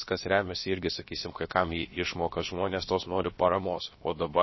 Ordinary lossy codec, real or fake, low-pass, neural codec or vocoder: MP3, 24 kbps; fake; 7.2 kHz; codec, 16 kHz, about 1 kbps, DyCAST, with the encoder's durations